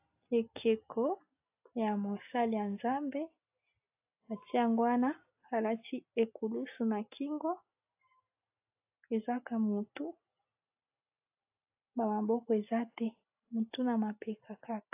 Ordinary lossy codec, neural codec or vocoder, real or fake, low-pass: AAC, 32 kbps; none; real; 3.6 kHz